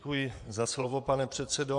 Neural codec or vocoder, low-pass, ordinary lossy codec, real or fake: vocoder, 24 kHz, 100 mel bands, Vocos; 10.8 kHz; AAC, 64 kbps; fake